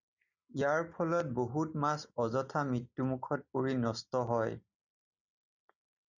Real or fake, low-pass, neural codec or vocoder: real; 7.2 kHz; none